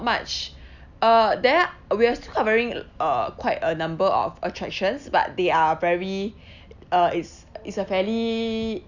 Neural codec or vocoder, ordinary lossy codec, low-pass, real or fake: none; none; 7.2 kHz; real